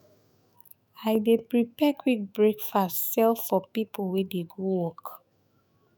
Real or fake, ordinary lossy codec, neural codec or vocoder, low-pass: fake; none; autoencoder, 48 kHz, 128 numbers a frame, DAC-VAE, trained on Japanese speech; none